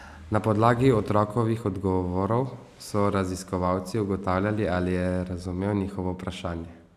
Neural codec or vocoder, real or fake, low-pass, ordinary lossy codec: none; real; 14.4 kHz; none